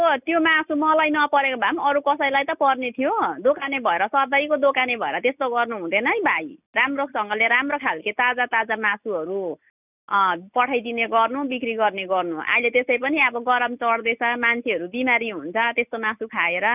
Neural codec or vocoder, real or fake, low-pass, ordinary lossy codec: none; real; 3.6 kHz; none